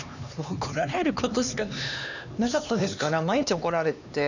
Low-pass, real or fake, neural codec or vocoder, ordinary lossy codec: 7.2 kHz; fake; codec, 16 kHz, 2 kbps, X-Codec, HuBERT features, trained on LibriSpeech; none